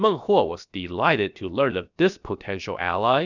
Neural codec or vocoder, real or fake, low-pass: codec, 16 kHz, about 1 kbps, DyCAST, with the encoder's durations; fake; 7.2 kHz